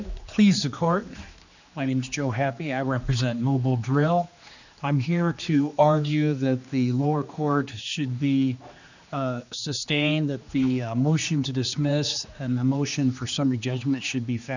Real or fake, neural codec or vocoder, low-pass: fake; codec, 16 kHz, 2 kbps, X-Codec, HuBERT features, trained on general audio; 7.2 kHz